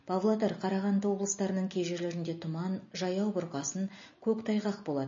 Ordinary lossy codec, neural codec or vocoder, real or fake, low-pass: MP3, 32 kbps; none; real; 7.2 kHz